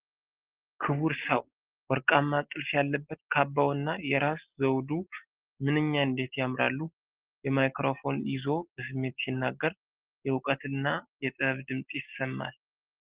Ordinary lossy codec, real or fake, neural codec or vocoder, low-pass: Opus, 16 kbps; real; none; 3.6 kHz